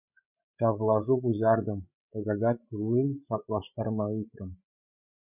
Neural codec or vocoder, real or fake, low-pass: codec, 16 kHz, 8 kbps, FreqCodec, larger model; fake; 3.6 kHz